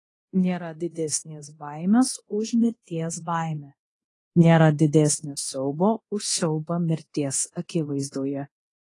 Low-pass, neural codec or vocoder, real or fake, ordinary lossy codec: 10.8 kHz; codec, 24 kHz, 0.9 kbps, DualCodec; fake; AAC, 32 kbps